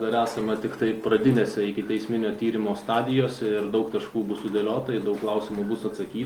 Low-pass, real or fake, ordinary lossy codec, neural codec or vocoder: 19.8 kHz; real; Opus, 24 kbps; none